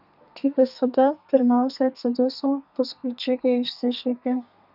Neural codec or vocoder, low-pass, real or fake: codec, 32 kHz, 1.9 kbps, SNAC; 5.4 kHz; fake